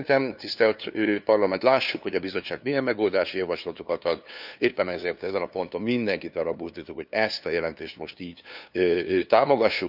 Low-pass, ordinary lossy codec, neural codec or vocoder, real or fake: 5.4 kHz; none; codec, 16 kHz, 2 kbps, FunCodec, trained on LibriTTS, 25 frames a second; fake